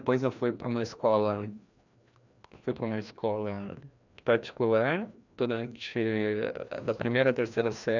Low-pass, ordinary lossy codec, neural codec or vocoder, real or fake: 7.2 kHz; none; codec, 16 kHz, 1 kbps, FreqCodec, larger model; fake